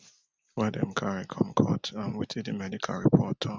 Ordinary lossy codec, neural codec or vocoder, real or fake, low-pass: none; none; real; none